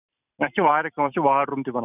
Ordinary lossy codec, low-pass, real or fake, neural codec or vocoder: none; 3.6 kHz; fake; vocoder, 44.1 kHz, 128 mel bands every 256 samples, BigVGAN v2